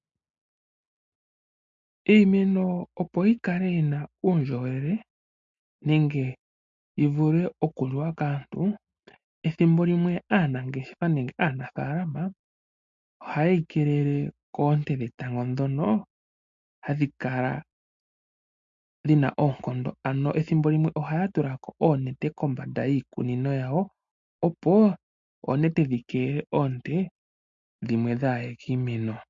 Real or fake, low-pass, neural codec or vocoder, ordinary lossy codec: real; 7.2 kHz; none; MP3, 48 kbps